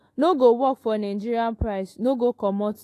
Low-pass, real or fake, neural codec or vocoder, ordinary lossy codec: 14.4 kHz; fake; autoencoder, 48 kHz, 128 numbers a frame, DAC-VAE, trained on Japanese speech; AAC, 48 kbps